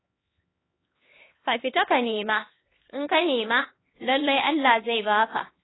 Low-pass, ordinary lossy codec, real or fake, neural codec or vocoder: 7.2 kHz; AAC, 16 kbps; fake; codec, 16 kHz, 2 kbps, X-Codec, HuBERT features, trained on LibriSpeech